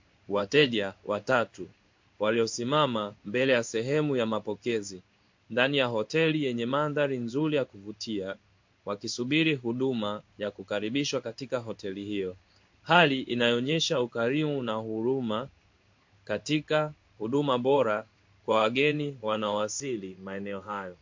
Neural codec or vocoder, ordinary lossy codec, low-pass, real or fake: codec, 16 kHz in and 24 kHz out, 1 kbps, XY-Tokenizer; MP3, 48 kbps; 7.2 kHz; fake